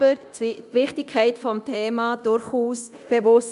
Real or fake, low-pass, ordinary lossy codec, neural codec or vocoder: fake; 10.8 kHz; none; codec, 24 kHz, 0.9 kbps, DualCodec